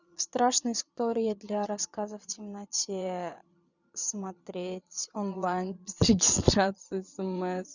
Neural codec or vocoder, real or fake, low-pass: vocoder, 22.05 kHz, 80 mel bands, Vocos; fake; 7.2 kHz